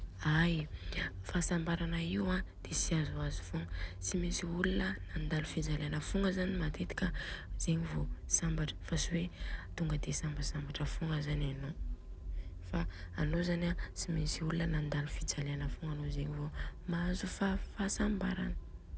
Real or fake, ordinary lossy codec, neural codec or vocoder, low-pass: real; none; none; none